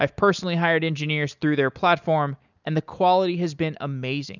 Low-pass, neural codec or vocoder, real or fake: 7.2 kHz; none; real